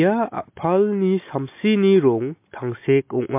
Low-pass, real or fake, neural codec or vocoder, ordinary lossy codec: 3.6 kHz; real; none; MP3, 24 kbps